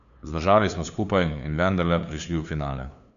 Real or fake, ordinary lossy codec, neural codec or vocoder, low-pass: fake; none; codec, 16 kHz, 2 kbps, FunCodec, trained on LibriTTS, 25 frames a second; 7.2 kHz